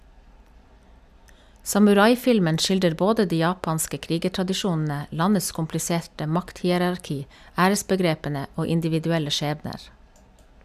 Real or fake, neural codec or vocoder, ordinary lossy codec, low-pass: real; none; none; 14.4 kHz